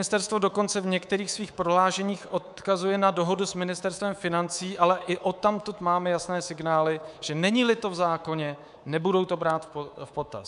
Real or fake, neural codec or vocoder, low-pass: fake; codec, 24 kHz, 3.1 kbps, DualCodec; 10.8 kHz